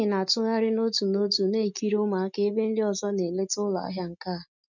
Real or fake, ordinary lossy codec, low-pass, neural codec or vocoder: real; none; 7.2 kHz; none